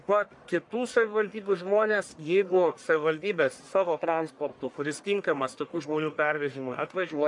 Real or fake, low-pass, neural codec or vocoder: fake; 10.8 kHz; codec, 44.1 kHz, 1.7 kbps, Pupu-Codec